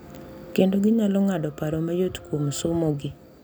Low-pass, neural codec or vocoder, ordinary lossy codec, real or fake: none; none; none; real